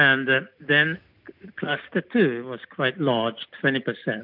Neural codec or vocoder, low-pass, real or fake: none; 5.4 kHz; real